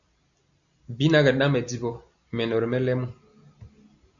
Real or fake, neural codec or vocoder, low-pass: real; none; 7.2 kHz